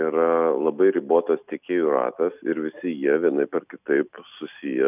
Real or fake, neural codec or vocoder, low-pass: real; none; 3.6 kHz